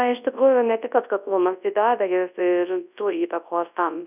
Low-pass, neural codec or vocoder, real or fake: 3.6 kHz; codec, 24 kHz, 0.9 kbps, WavTokenizer, large speech release; fake